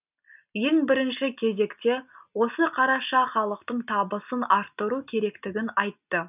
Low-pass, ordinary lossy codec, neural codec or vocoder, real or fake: 3.6 kHz; none; none; real